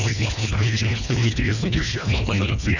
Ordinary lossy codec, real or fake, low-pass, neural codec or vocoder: none; fake; 7.2 kHz; codec, 24 kHz, 1.5 kbps, HILCodec